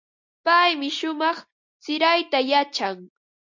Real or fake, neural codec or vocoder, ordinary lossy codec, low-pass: real; none; MP3, 48 kbps; 7.2 kHz